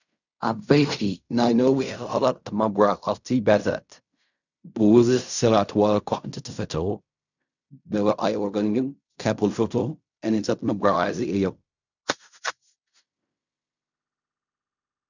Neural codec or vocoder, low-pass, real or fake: codec, 16 kHz in and 24 kHz out, 0.4 kbps, LongCat-Audio-Codec, fine tuned four codebook decoder; 7.2 kHz; fake